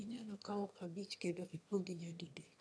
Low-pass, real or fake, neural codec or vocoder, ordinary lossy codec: none; fake; autoencoder, 22.05 kHz, a latent of 192 numbers a frame, VITS, trained on one speaker; none